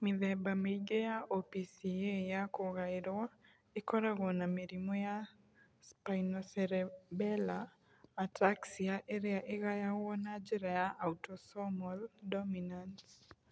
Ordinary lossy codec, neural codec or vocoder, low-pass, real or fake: none; none; none; real